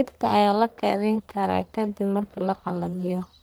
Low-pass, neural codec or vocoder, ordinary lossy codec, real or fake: none; codec, 44.1 kHz, 1.7 kbps, Pupu-Codec; none; fake